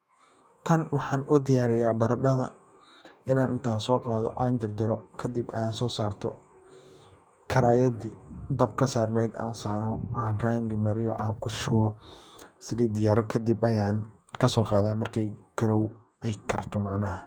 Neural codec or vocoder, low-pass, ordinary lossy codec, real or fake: codec, 44.1 kHz, 2.6 kbps, DAC; none; none; fake